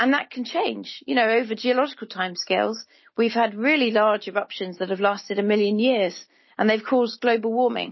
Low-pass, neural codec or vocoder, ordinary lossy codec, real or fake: 7.2 kHz; none; MP3, 24 kbps; real